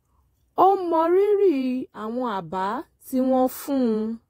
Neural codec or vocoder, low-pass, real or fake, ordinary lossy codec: vocoder, 48 kHz, 128 mel bands, Vocos; 19.8 kHz; fake; AAC, 48 kbps